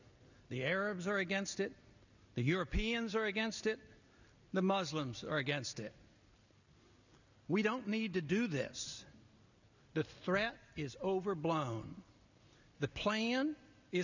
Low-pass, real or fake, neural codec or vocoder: 7.2 kHz; real; none